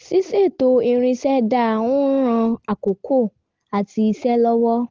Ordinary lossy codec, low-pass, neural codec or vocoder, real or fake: Opus, 16 kbps; 7.2 kHz; none; real